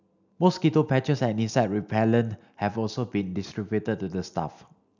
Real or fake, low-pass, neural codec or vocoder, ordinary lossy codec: real; 7.2 kHz; none; none